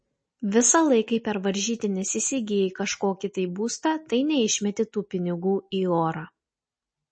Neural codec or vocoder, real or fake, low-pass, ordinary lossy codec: none; real; 9.9 kHz; MP3, 32 kbps